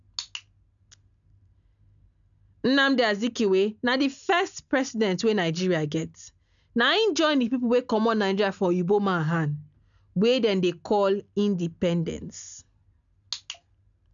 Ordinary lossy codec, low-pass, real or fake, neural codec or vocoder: none; 7.2 kHz; real; none